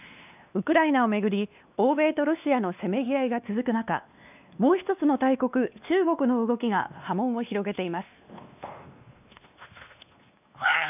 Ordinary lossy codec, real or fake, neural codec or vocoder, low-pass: none; fake; codec, 16 kHz, 2 kbps, X-Codec, WavLM features, trained on Multilingual LibriSpeech; 3.6 kHz